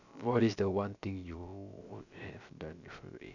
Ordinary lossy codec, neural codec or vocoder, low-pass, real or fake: none; codec, 16 kHz, about 1 kbps, DyCAST, with the encoder's durations; 7.2 kHz; fake